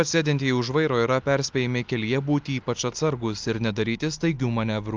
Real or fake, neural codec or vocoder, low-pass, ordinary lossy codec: real; none; 7.2 kHz; Opus, 24 kbps